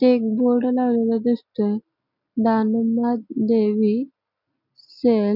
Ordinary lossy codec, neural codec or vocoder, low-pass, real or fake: none; none; 5.4 kHz; real